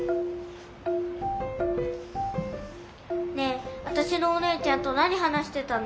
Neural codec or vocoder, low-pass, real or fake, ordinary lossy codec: none; none; real; none